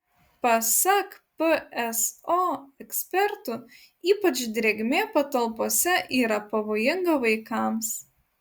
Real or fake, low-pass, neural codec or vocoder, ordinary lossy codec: real; 19.8 kHz; none; Opus, 64 kbps